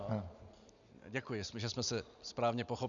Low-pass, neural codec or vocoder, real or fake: 7.2 kHz; none; real